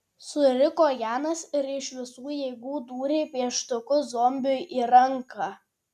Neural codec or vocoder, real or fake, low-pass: none; real; 14.4 kHz